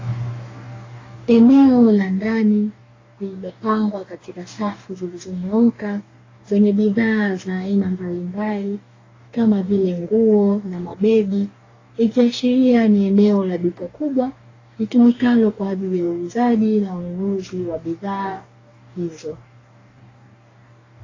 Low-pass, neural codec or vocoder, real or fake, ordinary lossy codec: 7.2 kHz; codec, 44.1 kHz, 2.6 kbps, DAC; fake; AAC, 32 kbps